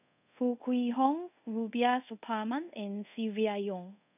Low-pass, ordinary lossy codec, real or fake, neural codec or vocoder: 3.6 kHz; none; fake; codec, 24 kHz, 0.5 kbps, DualCodec